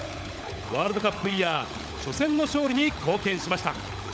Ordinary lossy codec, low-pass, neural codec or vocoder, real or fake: none; none; codec, 16 kHz, 16 kbps, FunCodec, trained on LibriTTS, 50 frames a second; fake